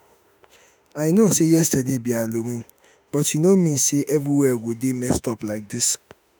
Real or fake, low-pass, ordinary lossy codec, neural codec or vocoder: fake; none; none; autoencoder, 48 kHz, 32 numbers a frame, DAC-VAE, trained on Japanese speech